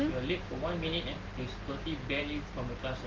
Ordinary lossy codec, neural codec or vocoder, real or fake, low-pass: Opus, 16 kbps; none; real; 7.2 kHz